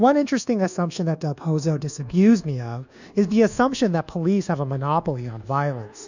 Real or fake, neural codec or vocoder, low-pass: fake; codec, 24 kHz, 1.2 kbps, DualCodec; 7.2 kHz